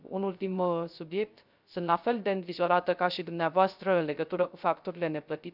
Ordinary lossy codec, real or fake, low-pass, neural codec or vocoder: none; fake; 5.4 kHz; codec, 16 kHz, 0.3 kbps, FocalCodec